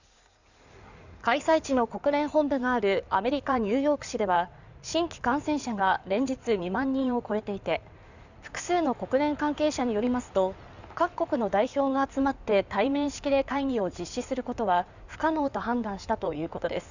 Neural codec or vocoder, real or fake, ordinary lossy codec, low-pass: codec, 16 kHz in and 24 kHz out, 2.2 kbps, FireRedTTS-2 codec; fake; none; 7.2 kHz